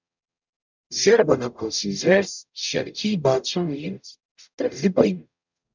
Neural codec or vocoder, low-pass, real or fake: codec, 44.1 kHz, 0.9 kbps, DAC; 7.2 kHz; fake